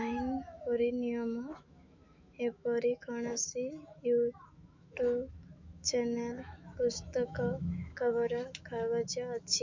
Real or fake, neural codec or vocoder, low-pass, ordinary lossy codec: fake; autoencoder, 48 kHz, 128 numbers a frame, DAC-VAE, trained on Japanese speech; 7.2 kHz; MP3, 64 kbps